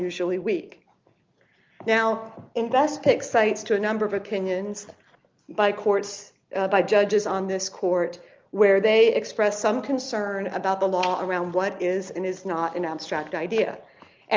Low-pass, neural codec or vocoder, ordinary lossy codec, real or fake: 7.2 kHz; none; Opus, 32 kbps; real